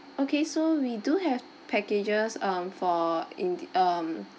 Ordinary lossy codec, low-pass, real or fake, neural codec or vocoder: none; none; real; none